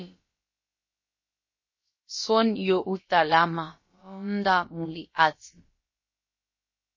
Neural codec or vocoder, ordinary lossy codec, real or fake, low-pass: codec, 16 kHz, about 1 kbps, DyCAST, with the encoder's durations; MP3, 32 kbps; fake; 7.2 kHz